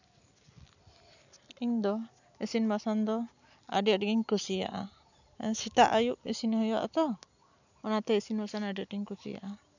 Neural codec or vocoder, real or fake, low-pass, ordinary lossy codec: none; real; 7.2 kHz; none